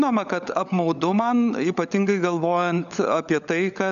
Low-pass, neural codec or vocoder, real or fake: 7.2 kHz; codec, 16 kHz, 16 kbps, FunCodec, trained on LibriTTS, 50 frames a second; fake